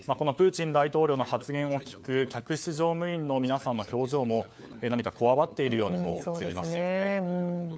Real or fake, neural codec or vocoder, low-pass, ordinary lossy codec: fake; codec, 16 kHz, 4 kbps, FunCodec, trained on LibriTTS, 50 frames a second; none; none